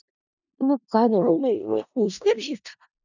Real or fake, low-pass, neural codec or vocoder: fake; 7.2 kHz; codec, 16 kHz in and 24 kHz out, 0.4 kbps, LongCat-Audio-Codec, four codebook decoder